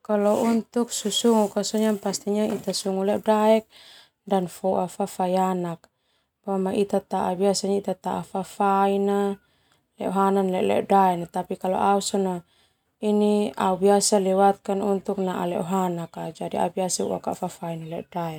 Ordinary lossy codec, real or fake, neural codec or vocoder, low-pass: none; real; none; 19.8 kHz